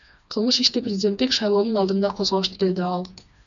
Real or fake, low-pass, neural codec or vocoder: fake; 7.2 kHz; codec, 16 kHz, 2 kbps, FreqCodec, smaller model